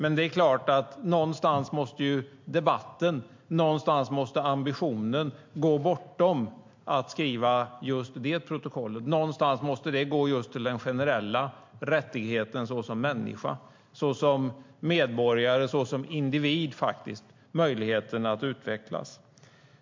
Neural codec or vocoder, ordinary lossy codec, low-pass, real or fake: none; MP3, 48 kbps; 7.2 kHz; real